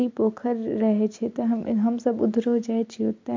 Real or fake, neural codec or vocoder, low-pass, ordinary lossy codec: real; none; 7.2 kHz; MP3, 48 kbps